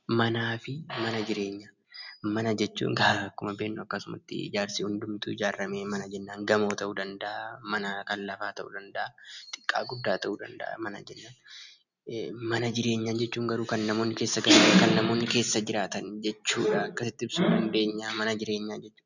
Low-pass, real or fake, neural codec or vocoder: 7.2 kHz; real; none